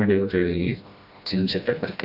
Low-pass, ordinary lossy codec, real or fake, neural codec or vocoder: 5.4 kHz; AAC, 48 kbps; fake; codec, 16 kHz, 1 kbps, FreqCodec, smaller model